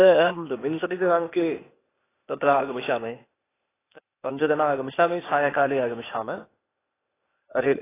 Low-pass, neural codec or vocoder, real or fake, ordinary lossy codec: 3.6 kHz; codec, 16 kHz, 0.8 kbps, ZipCodec; fake; AAC, 16 kbps